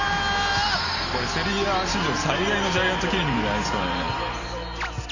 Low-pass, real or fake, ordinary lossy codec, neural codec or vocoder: 7.2 kHz; real; none; none